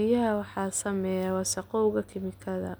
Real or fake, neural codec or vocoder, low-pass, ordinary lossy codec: real; none; none; none